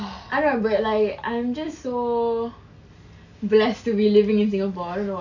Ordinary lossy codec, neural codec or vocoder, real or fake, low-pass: none; none; real; 7.2 kHz